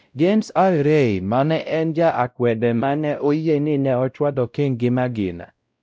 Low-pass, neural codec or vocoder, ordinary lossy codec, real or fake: none; codec, 16 kHz, 0.5 kbps, X-Codec, WavLM features, trained on Multilingual LibriSpeech; none; fake